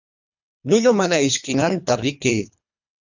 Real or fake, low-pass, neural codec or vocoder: fake; 7.2 kHz; codec, 24 kHz, 3 kbps, HILCodec